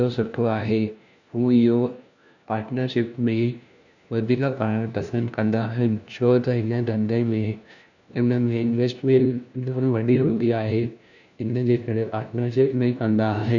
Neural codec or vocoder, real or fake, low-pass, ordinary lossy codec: codec, 16 kHz, 1 kbps, FunCodec, trained on LibriTTS, 50 frames a second; fake; 7.2 kHz; none